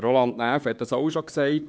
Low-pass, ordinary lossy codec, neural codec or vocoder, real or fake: none; none; codec, 16 kHz, 4 kbps, X-Codec, HuBERT features, trained on LibriSpeech; fake